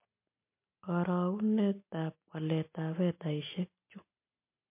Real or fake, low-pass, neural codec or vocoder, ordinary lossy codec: real; 3.6 kHz; none; MP3, 24 kbps